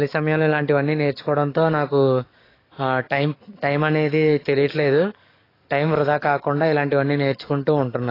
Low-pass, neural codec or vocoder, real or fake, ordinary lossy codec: 5.4 kHz; codec, 44.1 kHz, 7.8 kbps, DAC; fake; AAC, 24 kbps